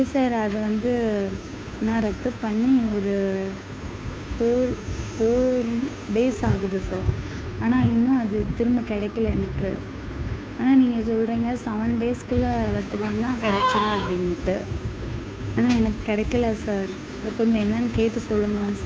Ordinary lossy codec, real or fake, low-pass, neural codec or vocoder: none; fake; none; codec, 16 kHz, 2 kbps, FunCodec, trained on Chinese and English, 25 frames a second